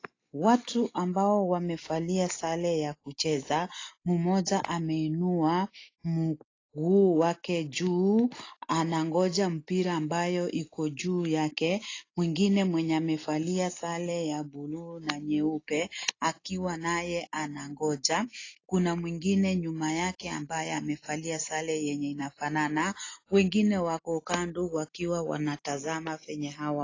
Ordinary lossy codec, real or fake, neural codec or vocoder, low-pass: AAC, 32 kbps; real; none; 7.2 kHz